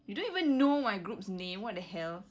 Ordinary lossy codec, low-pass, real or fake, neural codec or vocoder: none; none; real; none